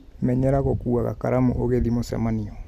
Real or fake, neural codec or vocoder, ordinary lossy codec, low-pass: real; none; none; 14.4 kHz